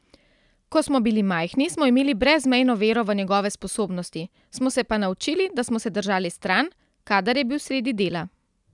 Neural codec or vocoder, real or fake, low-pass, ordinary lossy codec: none; real; 10.8 kHz; none